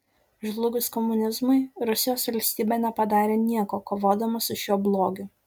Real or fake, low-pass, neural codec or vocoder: real; 19.8 kHz; none